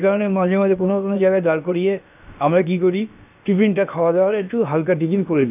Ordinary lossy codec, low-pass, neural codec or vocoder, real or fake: none; 3.6 kHz; codec, 16 kHz, about 1 kbps, DyCAST, with the encoder's durations; fake